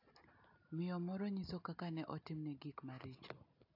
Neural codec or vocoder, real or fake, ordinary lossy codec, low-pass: none; real; AAC, 32 kbps; 5.4 kHz